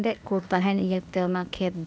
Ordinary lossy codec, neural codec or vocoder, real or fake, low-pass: none; codec, 16 kHz, 0.8 kbps, ZipCodec; fake; none